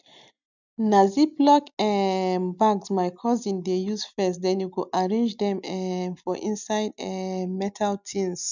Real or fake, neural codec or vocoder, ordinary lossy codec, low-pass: real; none; none; 7.2 kHz